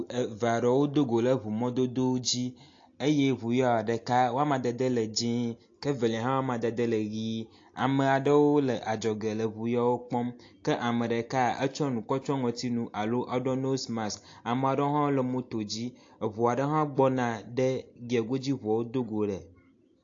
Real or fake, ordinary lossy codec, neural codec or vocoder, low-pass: real; AAC, 48 kbps; none; 7.2 kHz